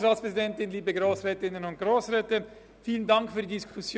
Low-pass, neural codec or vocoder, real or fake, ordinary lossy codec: none; none; real; none